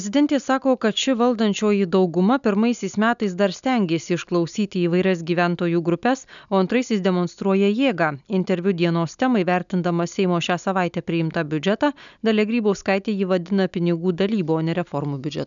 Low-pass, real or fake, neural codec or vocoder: 7.2 kHz; real; none